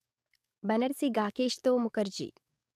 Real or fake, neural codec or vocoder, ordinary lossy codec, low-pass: fake; codec, 44.1 kHz, 7.8 kbps, DAC; none; 14.4 kHz